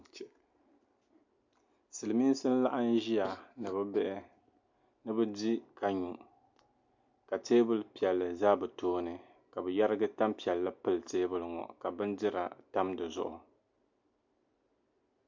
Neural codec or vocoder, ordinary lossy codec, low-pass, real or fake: none; MP3, 64 kbps; 7.2 kHz; real